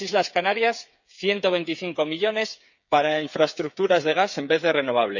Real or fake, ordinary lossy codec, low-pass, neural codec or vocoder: fake; none; 7.2 kHz; codec, 16 kHz, 8 kbps, FreqCodec, smaller model